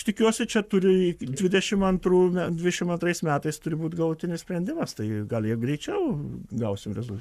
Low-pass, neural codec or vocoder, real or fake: 14.4 kHz; codec, 44.1 kHz, 7.8 kbps, Pupu-Codec; fake